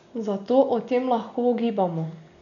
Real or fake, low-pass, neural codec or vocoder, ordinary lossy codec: real; 7.2 kHz; none; none